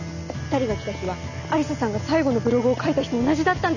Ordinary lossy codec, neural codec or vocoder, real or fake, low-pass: none; none; real; 7.2 kHz